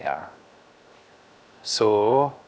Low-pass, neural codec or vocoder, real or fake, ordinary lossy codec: none; codec, 16 kHz, 0.7 kbps, FocalCodec; fake; none